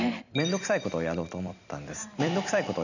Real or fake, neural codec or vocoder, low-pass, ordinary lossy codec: fake; vocoder, 44.1 kHz, 128 mel bands every 256 samples, BigVGAN v2; 7.2 kHz; none